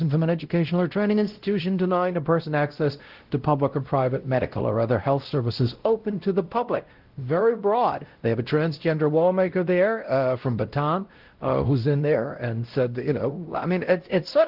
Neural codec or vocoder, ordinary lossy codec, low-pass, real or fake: codec, 16 kHz, 0.5 kbps, X-Codec, WavLM features, trained on Multilingual LibriSpeech; Opus, 16 kbps; 5.4 kHz; fake